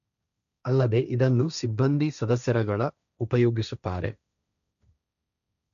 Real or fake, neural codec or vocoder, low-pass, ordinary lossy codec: fake; codec, 16 kHz, 1.1 kbps, Voila-Tokenizer; 7.2 kHz; none